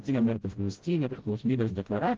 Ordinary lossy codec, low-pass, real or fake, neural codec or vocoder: Opus, 16 kbps; 7.2 kHz; fake; codec, 16 kHz, 0.5 kbps, FreqCodec, smaller model